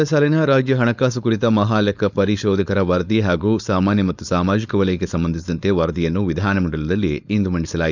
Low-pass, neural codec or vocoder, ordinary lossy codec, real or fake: 7.2 kHz; codec, 16 kHz, 4.8 kbps, FACodec; none; fake